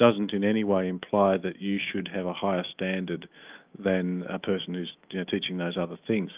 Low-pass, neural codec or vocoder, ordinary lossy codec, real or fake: 3.6 kHz; autoencoder, 48 kHz, 128 numbers a frame, DAC-VAE, trained on Japanese speech; Opus, 24 kbps; fake